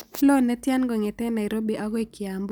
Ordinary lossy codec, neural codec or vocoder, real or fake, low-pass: none; none; real; none